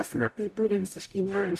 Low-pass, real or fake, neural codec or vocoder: 14.4 kHz; fake; codec, 44.1 kHz, 0.9 kbps, DAC